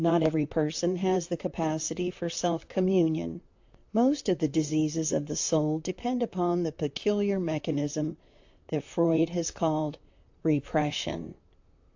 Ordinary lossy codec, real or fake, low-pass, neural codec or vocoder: AAC, 48 kbps; fake; 7.2 kHz; vocoder, 44.1 kHz, 128 mel bands, Pupu-Vocoder